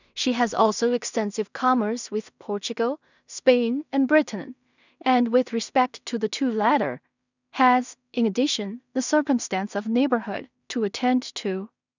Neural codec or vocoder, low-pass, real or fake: codec, 16 kHz in and 24 kHz out, 0.4 kbps, LongCat-Audio-Codec, two codebook decoder; 7.2 kHz; fake